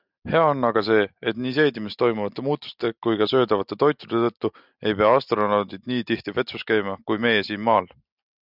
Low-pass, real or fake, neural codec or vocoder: 5.4 kHz; real; none